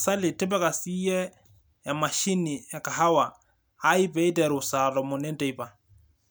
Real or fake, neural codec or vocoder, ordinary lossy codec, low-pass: real; none; none; none